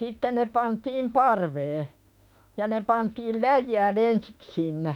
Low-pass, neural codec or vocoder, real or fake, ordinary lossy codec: 19.8 kHz; autoencoder, 48 kHz, 32 numbers a frame, DAC-VAE, trained on Japanese speech; fake; none